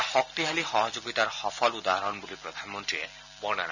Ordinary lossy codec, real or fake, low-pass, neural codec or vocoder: none; real; 7.2 kHz; none